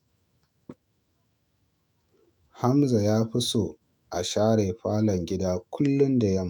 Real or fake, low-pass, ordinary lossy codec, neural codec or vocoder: fake; none; none; autoencoder, 48 kHz, 128 numbers a frame, DAC-VAE, trained on Japanese speech